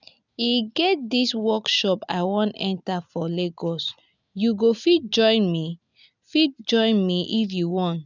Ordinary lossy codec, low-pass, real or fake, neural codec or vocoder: none; 7.2 kHz; real; none